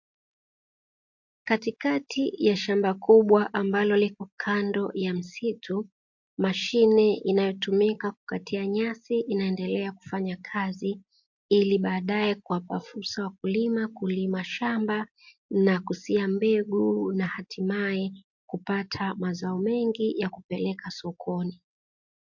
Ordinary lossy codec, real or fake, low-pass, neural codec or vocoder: MP3, 48 kbps; real; 7.2 kHz; none